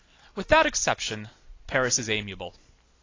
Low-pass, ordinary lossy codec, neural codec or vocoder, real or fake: 7.2 kHz; AAC, 32 kbps; none; real